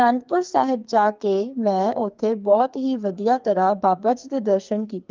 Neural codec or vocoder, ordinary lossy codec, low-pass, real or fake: codec, 44.1 kHz, 2.6 kbps, SNAC; Opus, 24 kbps; 7.2 kHz; fake